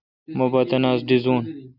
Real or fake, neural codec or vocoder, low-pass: real; none; 5.4 kHz